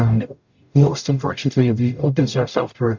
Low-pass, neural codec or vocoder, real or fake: 7.2 kHz; codec, 44.1 kHz, 0.9 kbps, DAC; fake